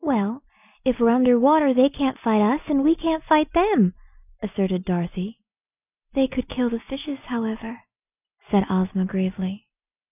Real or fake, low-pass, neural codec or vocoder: real; 3.6 kHz; none